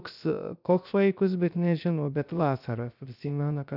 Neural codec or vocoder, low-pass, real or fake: codec, 16 kHz, 0.3 kbps, FocalCodec; 5.4 kHz; fake